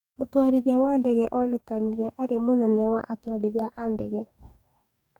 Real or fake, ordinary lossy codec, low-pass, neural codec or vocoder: fake; none; 19.8 kHz; codec, 44.1 kHz, 2.6 kbps, DAC